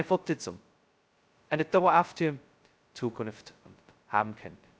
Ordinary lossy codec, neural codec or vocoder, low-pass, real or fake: none; codec, 16 kHz, 0.2 kbps, FocalCodec; none; fake